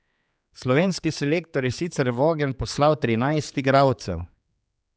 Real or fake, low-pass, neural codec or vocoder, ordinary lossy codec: fake; none; codec, 16 kHz, 4 kbps, X-Codec, HuBERT features, trained on general audio; none